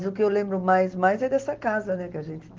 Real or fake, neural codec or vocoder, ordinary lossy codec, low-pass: real; none; Opus, 16 kbps; 7.2 kHz